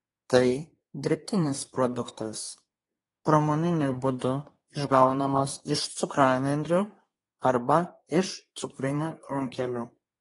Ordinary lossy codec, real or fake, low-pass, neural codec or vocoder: AAC, 32 kbps; fake; 10.8 kHz; codec, 24 kHz, 1 kbps, SNAC